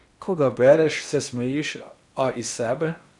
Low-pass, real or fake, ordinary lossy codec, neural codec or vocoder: 10.8 kHz; fake; none; codec, 16 kHz in and 24 kHz out, 0.8 kbps, FocalCodec, streaming, 65536 codes